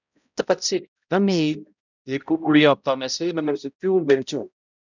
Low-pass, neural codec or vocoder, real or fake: 7.2 kHz; codec, 16 kHz, 0.5 kbps, X-Codec, HuBERT features, trained on balanced general audio; fake